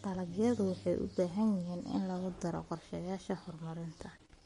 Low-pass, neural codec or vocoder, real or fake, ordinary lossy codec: 19.8 kHz; codec, 44.1 kHz, 7.8 kbps, Pupu-Codec; fake; MP3, 48 kbps